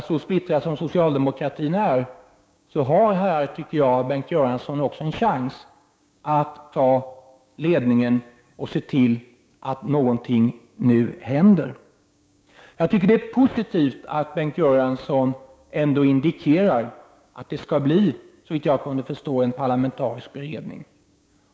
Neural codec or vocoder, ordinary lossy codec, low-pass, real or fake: codec, 16 kHz, 6 kbps, DAC; none; none; fake